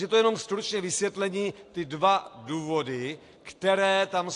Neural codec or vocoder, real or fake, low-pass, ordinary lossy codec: none; real; 10.8 kHz; AAC, 48 kbps